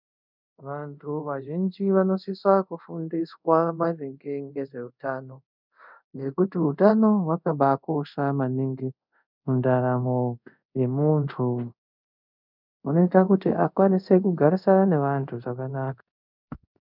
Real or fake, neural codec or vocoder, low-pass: fake; codec, 24 kHz, 0.5 kbps, DualCodec; 5.4 kHz